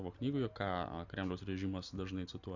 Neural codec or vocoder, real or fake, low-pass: none; real; 7.2 kHz